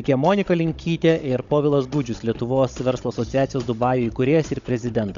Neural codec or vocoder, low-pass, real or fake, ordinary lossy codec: codec, 16 kHz, 16 kbps, FunCodec, trained on Chinese and English, 50 frames a second; 7.2 kHz; fake; Opus, 64 kbps